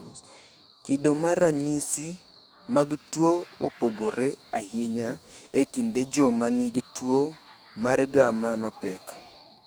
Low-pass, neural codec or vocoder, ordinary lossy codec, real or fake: none; codec, 44.1 kHz, 2.6 kbps, DAC; none; fake